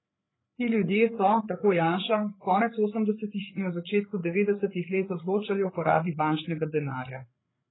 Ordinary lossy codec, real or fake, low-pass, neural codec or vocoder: AAC, 16 kbps; fake; 7.2 kHz; codec, 16 kHz, 8 kbps, FreqCodec, larger model